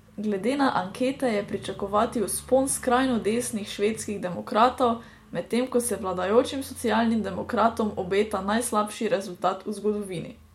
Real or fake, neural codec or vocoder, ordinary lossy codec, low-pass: real; none; MP3, 64 kbps; 19.8 kHz